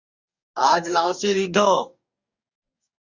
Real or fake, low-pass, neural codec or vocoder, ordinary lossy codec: fake; 7.2 kHz; codec, 44.1 kHz, 2.6 kbps, DAC; Opus, 64 kbps